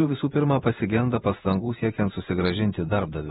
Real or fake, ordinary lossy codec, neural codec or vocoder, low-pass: fake; AAC, 16 kbps; vocoder, 48 kHz, 128 mel bands, Vocos; 19.8 kHz